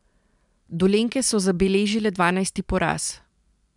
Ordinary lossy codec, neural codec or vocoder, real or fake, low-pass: none; none; real; 10.8 kHz